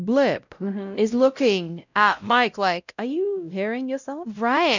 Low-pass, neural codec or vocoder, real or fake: 7.2 kHz; codec, 16 kHz, 0.5 kbps, X-Codec, WavLM features, trained on Multilingual LibriSpeech; fake